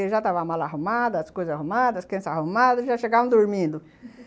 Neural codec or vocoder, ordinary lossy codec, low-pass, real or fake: none; none; none; real